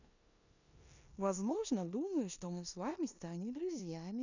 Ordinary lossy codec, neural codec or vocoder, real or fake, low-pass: none; codec, 16 kHz in and 24 kHz out, 0.9 kbps, LongCat-Audio-Codec, four codebook decoder; fake; 7.2 kHz